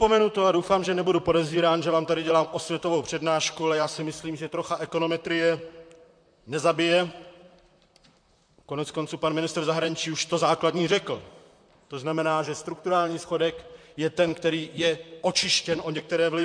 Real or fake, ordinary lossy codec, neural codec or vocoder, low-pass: fake; MP3, 64 kbps; vocoder, 44.1 kHz, 128 mel bands, Pupu-Vocoder; 9.9 kHz